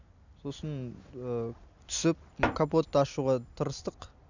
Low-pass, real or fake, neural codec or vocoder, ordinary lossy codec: 7.2 kHz; real; none; none